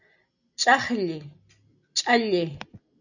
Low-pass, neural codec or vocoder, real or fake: 7.2 kHz; none; real